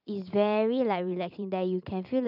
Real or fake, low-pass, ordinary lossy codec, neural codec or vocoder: fake; 5.4 kHz; none; vocoder, 44.1 kHz, 128 mel bands every 512 samples, BigVGAN v2